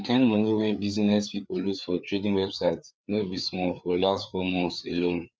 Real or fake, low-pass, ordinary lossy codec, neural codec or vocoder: fake; none; none; codec, 16 kHz, 4 kbps, FreqCodec, larger model